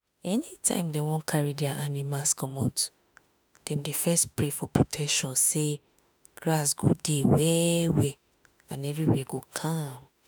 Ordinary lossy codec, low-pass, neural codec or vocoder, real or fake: none; none; autoencoder, 48 kHz, 32 numbers a frame, DAC-VAE, trained on Japanese speech; fake